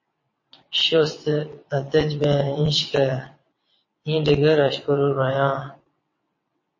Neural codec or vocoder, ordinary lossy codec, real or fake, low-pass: vocoder, 22.05 kHz, 80 mel bands, WaveNeXt; MP3, 32 kbps; fake; 7.2 kHz